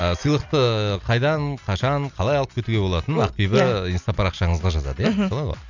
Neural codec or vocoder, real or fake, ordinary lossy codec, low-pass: none; real; none; 7.2 kHz